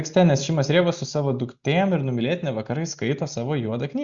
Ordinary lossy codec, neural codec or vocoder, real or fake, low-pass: Opus, 64 kbps; none; real; 7.2 kHz